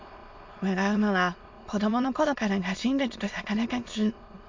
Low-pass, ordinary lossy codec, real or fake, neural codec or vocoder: 7.2 kHz; MP3, 48 kbps; fake; autoencoder, 22.05 kHz, a latent of 192 numbers a frame, VITS, trained on many speakers